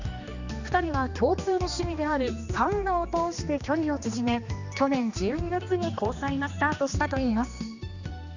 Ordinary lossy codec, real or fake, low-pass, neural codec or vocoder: none; fake; 7.2 kHz; codec, 16 kHz, 2 kbps, X-Codec, HuBERT features, trained on general audio